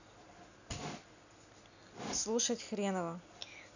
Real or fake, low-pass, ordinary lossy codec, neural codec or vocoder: real; 7.2 kHz; none; none